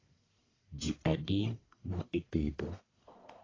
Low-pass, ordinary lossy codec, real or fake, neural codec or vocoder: 7.2 kHz; AAC, 32 kbps; fake; codec, 44.1 kHz, 1.7 kbps, Pupu-Codec